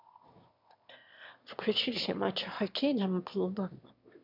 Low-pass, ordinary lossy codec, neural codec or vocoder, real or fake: 5.4 kHz; MP3, 48 kbps; autoencoder, 22.05 kHz, a latent of 192 numbers a frame, VITS, trained on one speaker; fake